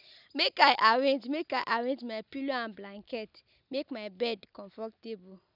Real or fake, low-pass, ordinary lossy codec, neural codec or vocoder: real; 5.4 kHz; none; none